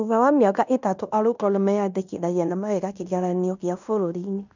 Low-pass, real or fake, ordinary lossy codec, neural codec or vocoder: 7.2 kHz; fake; none; codec, 16 kHz in and 24 kHz out, 0.9 kbps, LongCat-Audio-Codec, fine tuned four codebook decoder